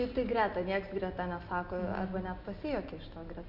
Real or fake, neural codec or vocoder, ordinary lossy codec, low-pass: real; none; AAC, 48 kbps; 5.4 kHz